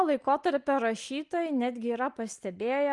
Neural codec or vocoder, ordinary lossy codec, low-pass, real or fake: none; Opus, 32 kbps; 10.8 kHz; real